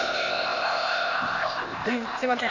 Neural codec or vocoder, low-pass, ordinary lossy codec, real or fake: codec, 16 kHz, 0.8 kbps, ZipCodec; 7.2 kHz; none; fake